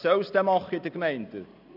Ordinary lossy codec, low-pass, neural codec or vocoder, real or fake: none; 5.4 kHz; none; real